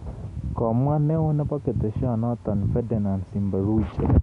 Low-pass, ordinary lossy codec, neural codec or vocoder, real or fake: 10.8 kHz; none; none; real